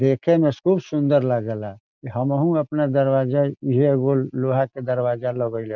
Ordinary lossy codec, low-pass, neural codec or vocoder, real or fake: none; 7.2 kHz; none; real